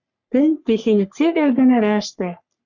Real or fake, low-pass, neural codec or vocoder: fake; 7.2 kHz; codec, 44.1 kHz, 3.4 kbps, Pupu-Codec